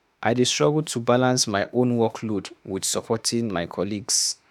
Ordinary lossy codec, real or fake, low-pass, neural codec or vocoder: none; fake; 19.8 kHz; autoencoder, 48 kHz, 32 numbers a frame, DAC-VAE, trained on Japanese speech